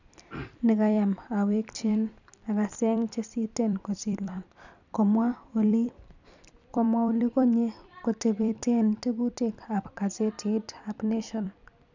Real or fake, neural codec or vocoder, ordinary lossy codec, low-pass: fake; vocoder, 44.1 kHz, 128 mel bands every 256 samples, BigVGAN v2; none; 7.2 kHz